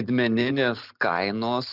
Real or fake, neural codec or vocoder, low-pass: real; none; 5.4 kHz